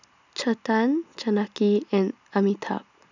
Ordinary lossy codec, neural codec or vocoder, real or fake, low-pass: none; none; real; 7.2 kHz